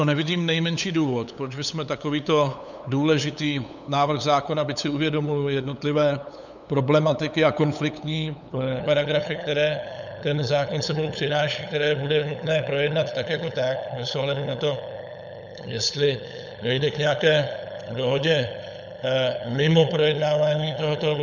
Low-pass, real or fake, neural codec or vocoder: 7.2 kHz; fake; codec, 16 kHz, 8 kbps, FunCodec, trained on LibriTTS, 25 frames a second